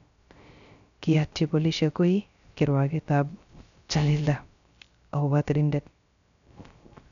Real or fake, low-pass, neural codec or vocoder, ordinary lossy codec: fake; 7.2 kHz; codec, 16 kHz, 0.3 kbps, FocalCodec; none